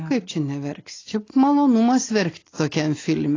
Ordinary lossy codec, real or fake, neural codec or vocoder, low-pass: AAC, 32 kbps; real; none; 7.2 kHz